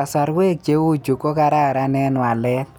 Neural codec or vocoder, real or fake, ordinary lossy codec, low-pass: none; real; none; none